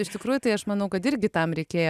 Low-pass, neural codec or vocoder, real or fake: 14.4 kHz; none; real